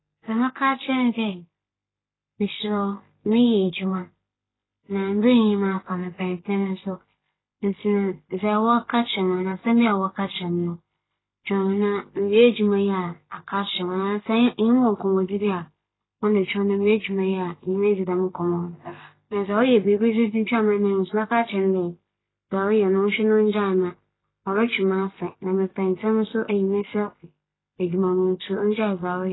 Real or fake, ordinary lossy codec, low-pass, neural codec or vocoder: real; AAC, 16 kbps; 7.2 kHz; none